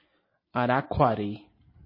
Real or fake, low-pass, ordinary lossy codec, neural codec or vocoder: real; 5.4 kHz; MP3, 24 kbps; none